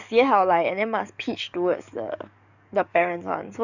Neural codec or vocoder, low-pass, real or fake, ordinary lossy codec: codec, 44.1 kHz, 7.8 kbps, DAC; 7.2 kHz; fake; none